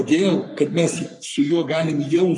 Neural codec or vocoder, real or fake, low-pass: codec, 44.1 kHz, 3.4 kbps, Pupu-Codec; fake; 10.8 kHz